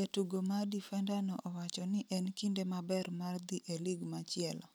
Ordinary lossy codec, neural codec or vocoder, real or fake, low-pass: none; none; real; none